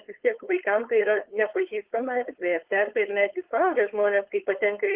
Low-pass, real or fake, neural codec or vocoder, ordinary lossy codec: 3.6 kHz; fake; codec, 16 kHz, 4.8 kbps, FACodec; Opus, 16 kbps